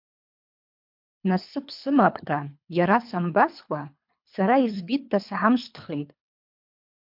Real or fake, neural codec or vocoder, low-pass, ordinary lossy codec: fake; codec, 24 kHz, 3 kbps, HILCodec; 5.4 kHz; MP3, 48 kbps